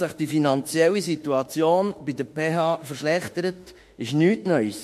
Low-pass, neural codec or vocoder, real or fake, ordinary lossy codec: 14.4 kHz; autoencoder, 48 kHz, 32 numbers a frame, DAC-VAE, trained on Japanese speech; fake; MP3, 64 kbps